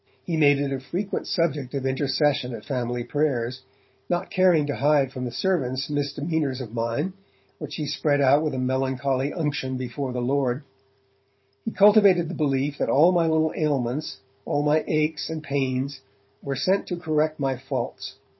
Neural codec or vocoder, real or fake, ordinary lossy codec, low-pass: vocoder, 44.1 kHz, 128 mel bands every 512 samples, BigVGAN v2; fake; MP3, 24 kbps; 7.2 kHz